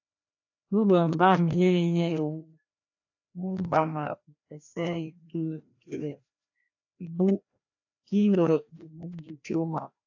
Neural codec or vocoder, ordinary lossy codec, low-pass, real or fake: codec, 16 kHz, 1 kbps, FreqCodec, larger model; AAC, 48 kbps; 7.2 kHz; fake